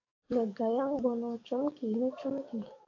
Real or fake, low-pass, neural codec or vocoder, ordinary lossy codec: fake; 7.2 kHz; codec, 44.1 kHz, 7.8 kbps, DAC; AAC, 48 kbps